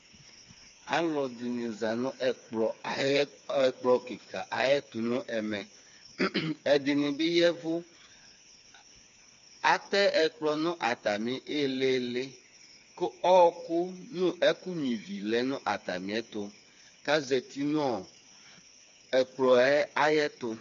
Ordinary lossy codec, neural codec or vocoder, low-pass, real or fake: MP3, 48 kbps; codec, 16 kHz, 4 kbps, FreqCodec, smaller model; 7.2 kHz; fake